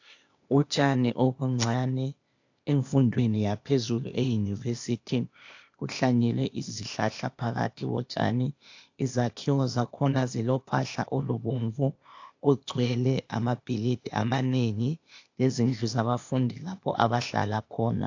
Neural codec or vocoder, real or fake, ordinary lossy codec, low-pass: codec, 16 kHz, 0.8 kbps, ZipCodec; fake; AAC, 48 kbps; 7.2 kHz